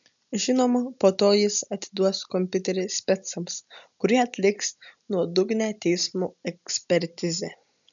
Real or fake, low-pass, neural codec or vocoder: real; 7.2 kHz; none